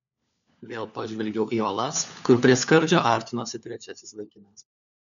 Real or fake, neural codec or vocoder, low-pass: fake; codec, 16 kHz, 4 kbps, FunCodec, trained on LibriTTS, 50 frames a second; 7.2 kHz